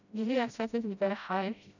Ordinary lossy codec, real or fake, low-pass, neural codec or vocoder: none; fake; 7.2 kHz; codec, 16 kHz, 0.5 kbps, FreqCodec, smaller model